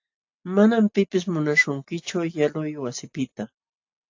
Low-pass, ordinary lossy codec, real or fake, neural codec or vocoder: 7.2 kHz; AAC, 48 kbps; real; none